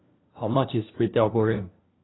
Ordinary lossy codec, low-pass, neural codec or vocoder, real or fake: AAC, 16 kbps; 7.2 kHz; codec, 16 kHz, 1 kbps, FunCodec, trained on LibriTTS, 50 frames a second; fake